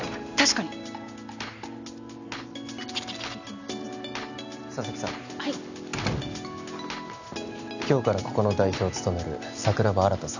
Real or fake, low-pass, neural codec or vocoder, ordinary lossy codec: real; 7.2 kHz; none; none